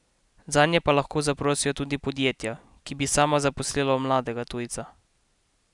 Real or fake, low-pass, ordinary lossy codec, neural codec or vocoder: real; 10.8 kHz; none; none